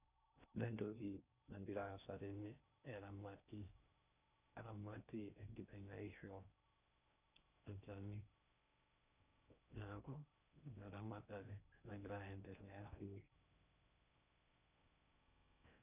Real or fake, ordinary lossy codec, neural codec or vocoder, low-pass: fake; none; codec, 16 kHz in and 24 kHz out, 0.6 kbps, FocalCodec, streaming, 4096 codes; 3.6 kHz